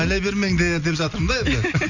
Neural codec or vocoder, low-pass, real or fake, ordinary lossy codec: none; 7.2 kHz; real; none